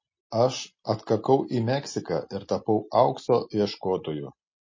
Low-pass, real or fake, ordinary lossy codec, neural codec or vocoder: 7.2 kHz; real; MP3, 32 kbps; none